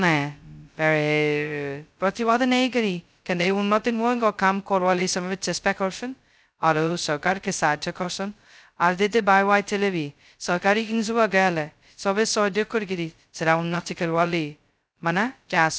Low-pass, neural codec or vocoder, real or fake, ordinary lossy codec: none; codec, 16 kHz, 0.2 kbps, FocalCodec; fake; none